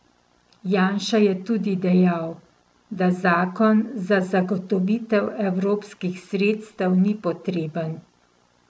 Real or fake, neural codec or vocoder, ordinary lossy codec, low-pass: real; none; none; none